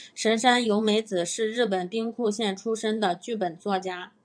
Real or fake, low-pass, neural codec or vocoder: fake; 9.9 kHz; vocoder, 22.05 kHz, 80 mel bands, WaveNeXt